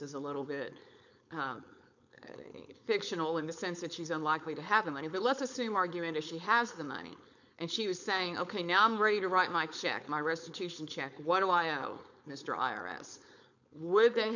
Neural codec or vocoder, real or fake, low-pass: codec, 16 kHz, 4.8 kbps, FACodec; fake; 7.2 kHz